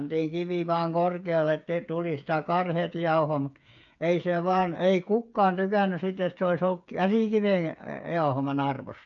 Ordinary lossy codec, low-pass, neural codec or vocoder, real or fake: none; 7.2 kHz; codec, 16 kHz, 8 kbps, FreqCodec, smaller model; fake